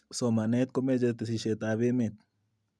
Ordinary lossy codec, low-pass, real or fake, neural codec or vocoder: none; none; real; none